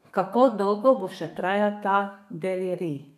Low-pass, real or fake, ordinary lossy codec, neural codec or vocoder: 14.4 kHz; fake; none; codec, 32 kHz, 1.9 kbps, SNAC